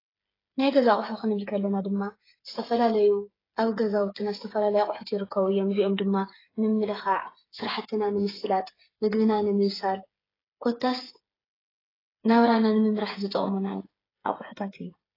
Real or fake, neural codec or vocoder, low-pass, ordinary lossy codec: fake; codec, 16 kHz, 8 kbps, FreqCodec, smaller model; 5.4 kHz; AAC, 24 kbps